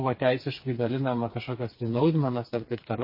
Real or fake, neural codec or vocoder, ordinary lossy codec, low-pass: fake; codec, 16 kHz, 4 kbps, FreqCodec, smaller model; MP3, 24 kbps; 5.4 kHz